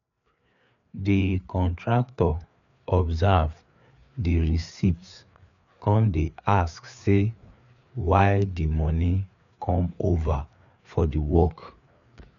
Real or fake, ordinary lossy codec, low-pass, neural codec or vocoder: fake; none; 7.2 kHz; codec, 16 kHz, 4 kbps, FreqCodec, larger model